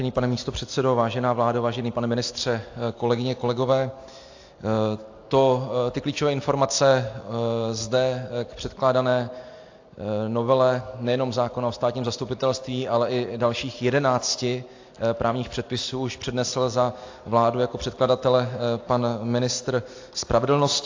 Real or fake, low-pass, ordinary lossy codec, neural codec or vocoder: real; 7.2 kHz; AAC, 48 kbps; none